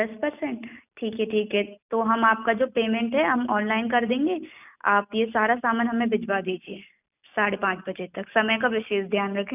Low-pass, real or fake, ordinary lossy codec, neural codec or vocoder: 3.6 kHz; real; none; none